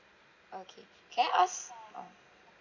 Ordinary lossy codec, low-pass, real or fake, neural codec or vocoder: none; 7.2 kHz; real; none